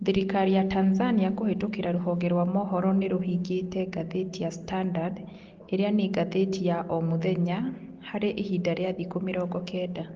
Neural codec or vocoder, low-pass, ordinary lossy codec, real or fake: none; 7.2 kHz; Opus, 16 kbps; real